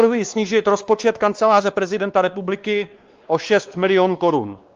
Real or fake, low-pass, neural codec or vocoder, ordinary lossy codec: fake; 7.2 kHz; codec, 16 kHz, 2 kbps, X-Codec, WavLM features, trained on Multilingual LibriSpeech; Opus, 32 kbps